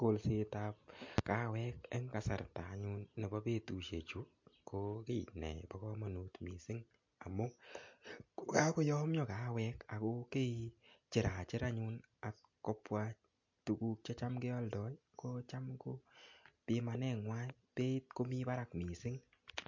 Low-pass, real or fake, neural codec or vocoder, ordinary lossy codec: 7.2 kHz; real; none; MP3, 48 kbps